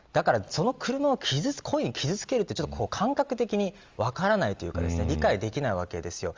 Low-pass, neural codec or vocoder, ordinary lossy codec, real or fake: 7.2 kHz; none; Opus, 32 kbps; real